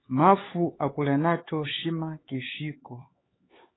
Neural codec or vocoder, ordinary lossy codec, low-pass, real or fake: codec, 16 kHz, 4 kbps, X-Codec, HuBERT features, trained on LibriSpeech; AAC, 16 kbps; 7.2 kHz; fake